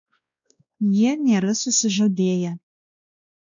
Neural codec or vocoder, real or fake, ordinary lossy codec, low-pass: codec, 16 kHz, 1 kbps, X-Codec, WavLM features, trained on Multilingual LibriSpeech; fake; MP3, 64 kbps; 7.2 kHz